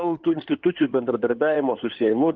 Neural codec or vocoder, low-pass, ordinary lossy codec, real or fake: codec, 16 kHz in and 24 kHz out, 2.2 kbps, FireRedTTS-2 codec; 7.2 kHz; Opus, 32 kbps; fake